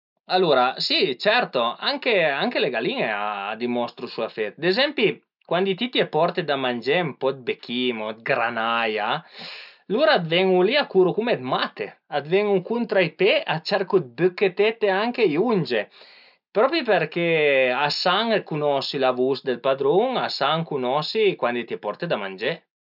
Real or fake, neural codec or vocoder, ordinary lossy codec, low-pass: real; none; none; 5.4 kHz